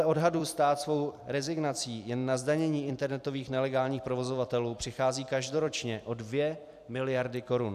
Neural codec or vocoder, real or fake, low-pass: vocoder, 44.1 kHz, 128 mel bands every 256 samples, BigVGAN v2; fake; 14.4 kHz